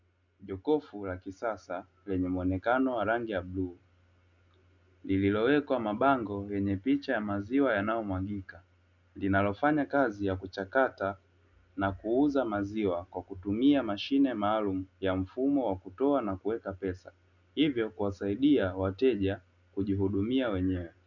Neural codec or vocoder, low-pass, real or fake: none; 7.2 kHz; real